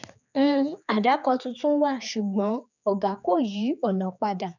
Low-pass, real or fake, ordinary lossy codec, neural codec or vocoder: 7.2 kHz; fake; none; codec, 16 kHz, 4 kbps, X-Codec, HuBERT features, trained on general audio